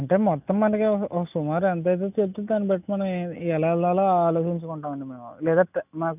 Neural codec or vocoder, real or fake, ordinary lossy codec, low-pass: none; real; none; 3.6 kHz